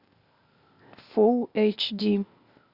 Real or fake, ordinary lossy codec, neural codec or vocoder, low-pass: fake; Opus, 64 kbps; codec, 16 kHz, 0.8 kbps, ZipCodec; 5.4 kHz